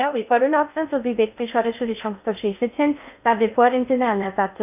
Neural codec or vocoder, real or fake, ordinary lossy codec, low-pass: codec, 16 kHz in and 24 kHz out, 0.6 kbps, FocalCodec, streaming, 2048 codes; fake; none; 3.6 kHz